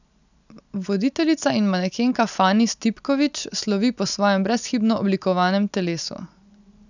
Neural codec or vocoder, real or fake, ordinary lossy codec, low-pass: none; real; none; 7.2 kHz